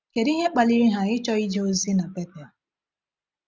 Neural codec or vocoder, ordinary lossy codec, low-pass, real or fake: none; none; none; real